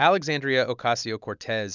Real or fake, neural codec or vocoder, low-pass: real; none; 7.2 kHz